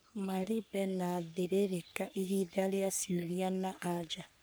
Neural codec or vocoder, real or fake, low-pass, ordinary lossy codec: codec, 44.1 kHz, 3.4 kbps, Pupu-Codec; fake; none; none